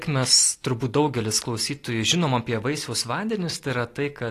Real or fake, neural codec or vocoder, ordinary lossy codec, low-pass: real; none; AAC, 48 kbps; 14.4 kHz